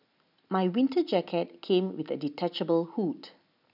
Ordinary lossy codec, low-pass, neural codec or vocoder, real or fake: none; 5.4 kHz; none; real